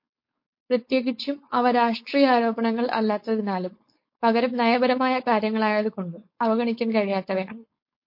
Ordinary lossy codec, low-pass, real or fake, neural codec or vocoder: MP3, 32 kbps; 5.4 kHz; fake; codec, 16 kHz, 4.8 kbps, FACodec